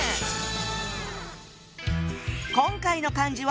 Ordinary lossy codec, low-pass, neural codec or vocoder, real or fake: none; none; none; real